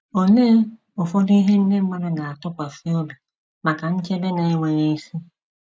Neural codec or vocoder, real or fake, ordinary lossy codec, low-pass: none; real; none; none